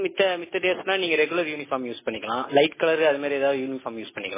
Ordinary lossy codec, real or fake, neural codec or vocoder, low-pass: MP3, 16 kbps; real; none; 3.6 kHz